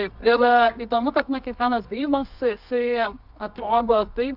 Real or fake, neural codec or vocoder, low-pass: fake; codec, 24 kHz, 0.9 kbps, WavTokenizer, medium music audio release; 5.4 kHz